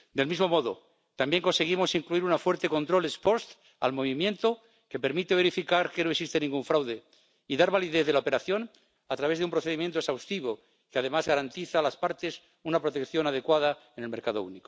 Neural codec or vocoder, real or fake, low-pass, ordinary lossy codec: none; real; none; none